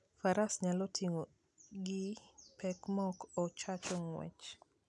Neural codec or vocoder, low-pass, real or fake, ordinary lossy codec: none; 10.8 kHz; real; none